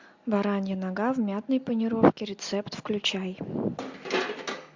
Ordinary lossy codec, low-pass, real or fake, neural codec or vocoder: MP3, 64 kbps; 7.2 kHz; real; none